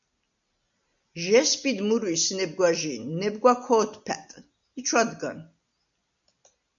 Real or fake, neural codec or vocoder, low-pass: real; none; 7.2 kHz